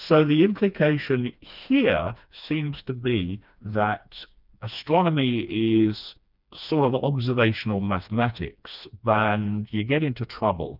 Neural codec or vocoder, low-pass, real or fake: codec, 16 kHz, 2 kbps, FreqCodec, smaller model; 5.4 kHz; fake